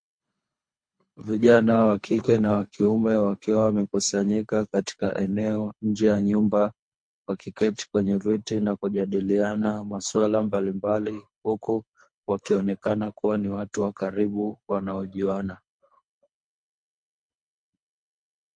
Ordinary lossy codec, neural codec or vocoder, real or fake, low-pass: MP3, 48 kbps; codec, 24 kHz, 3 kbps, HILCodec; fake; 9.9 kHz